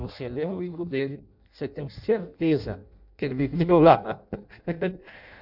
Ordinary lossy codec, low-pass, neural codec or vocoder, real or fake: none; 5.4 kHz; codec, 16 kHz in and 24 kHz out, 0.6 kbps, FireRedTTS-2 codec; fake